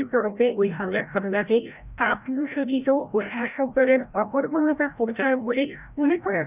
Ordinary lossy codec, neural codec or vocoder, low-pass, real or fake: none; codec, 16 kHz, 0.5 kbps, FreqCodec, larger model; 3.6 kHz; fake